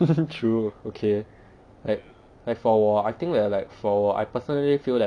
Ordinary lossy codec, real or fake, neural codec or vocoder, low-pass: none; real; none; 9.9 kHz